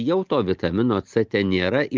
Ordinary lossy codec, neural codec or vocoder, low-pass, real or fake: Opus, 32 kbps; none; 7.2 kHz; real